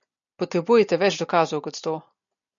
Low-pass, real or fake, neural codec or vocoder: 7.2 kHz; real; none